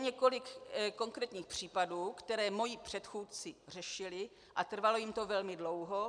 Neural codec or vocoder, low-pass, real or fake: none; 9.9 kHz; real